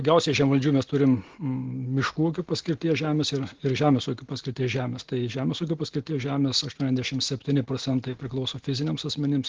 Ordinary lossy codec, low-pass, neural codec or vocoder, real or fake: Opus, 16 kbps; 7.2 kHz; none; real